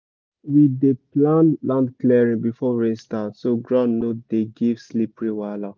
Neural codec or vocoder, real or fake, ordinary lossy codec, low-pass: none; real; none; none